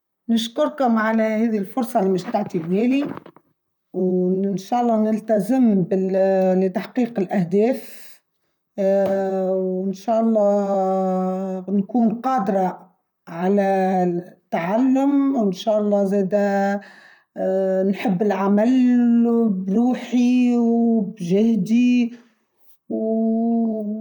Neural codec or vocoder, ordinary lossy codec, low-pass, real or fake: vocoder, 44.1 kHz, 128 mel bands, Pupu-Vocoder; none; 19.8 kHz; fake